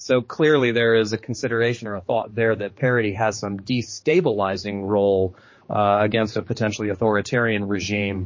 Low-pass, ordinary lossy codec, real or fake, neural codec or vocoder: 7.2 kHz; MP3, 32 kbps; fake; codec, 16 kHz, 4 kbps, X-Codec, HuBERT features, trained on general audio